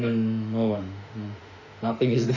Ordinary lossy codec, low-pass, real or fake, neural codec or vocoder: none; 7.2 kHz; fake; autoencoder, 48 kHz, 32 numbers a frame, DAC-VAE, trained on Japanese speech